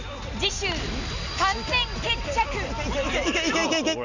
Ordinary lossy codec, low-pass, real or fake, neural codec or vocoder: none; 7.2 kHz; real; none